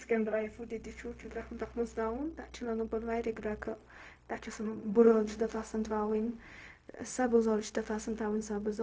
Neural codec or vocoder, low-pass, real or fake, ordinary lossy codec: codec, 16 kHz, 0.4 kbps, LongCat-Audio-Codec; none; fake; none